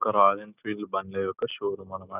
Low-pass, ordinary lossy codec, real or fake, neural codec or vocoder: 3.6 kHz; none; real; none